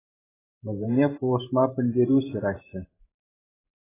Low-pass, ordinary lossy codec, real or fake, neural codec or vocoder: 3.6 kHz; AAC, 16 kbps; fake; vocoder, 44.1 kHz, 128 mel bands every 512 samples, BigVGAN v2